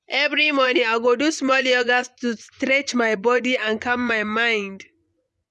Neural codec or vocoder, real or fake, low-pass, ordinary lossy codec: vocoder, 24 kHz, 100 mel bands, Vocos; fake; none; none